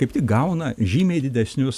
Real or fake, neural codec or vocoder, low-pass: real; none; 14.4 kHz